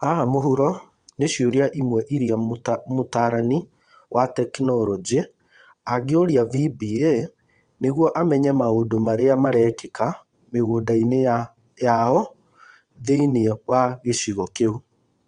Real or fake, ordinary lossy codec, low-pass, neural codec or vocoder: fake; Opus, 64 kbps; 9.9 kHz; vocoder, 22.05 kHz, 80 mel bands, WaveNeXt